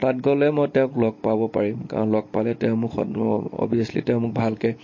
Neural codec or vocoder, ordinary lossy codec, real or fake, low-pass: none; MP3, 32 kbps; real; 7.2 kHz